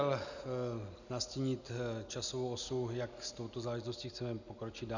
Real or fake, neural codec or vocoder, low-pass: real; none; 7.2 kHz